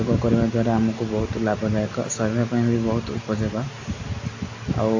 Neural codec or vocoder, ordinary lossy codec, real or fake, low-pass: none; AAC, 48 kbps; real; 7.2 kHz